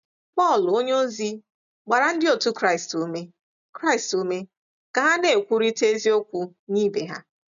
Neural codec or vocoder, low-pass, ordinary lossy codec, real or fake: none; 7.2 kHz; none; real